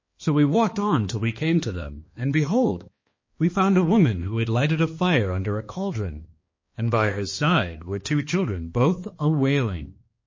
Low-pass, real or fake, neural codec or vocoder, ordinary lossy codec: 7.2 kHz; fake; codec, 16 kHz, 2 kbps, X-Codec, HuBERT features, trained on balanced general audio; MP3, 32 kbps